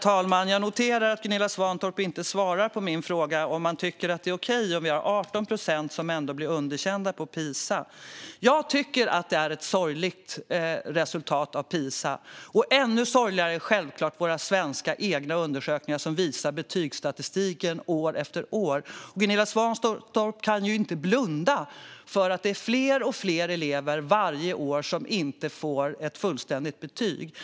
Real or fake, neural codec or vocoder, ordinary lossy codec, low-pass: real; none; none; none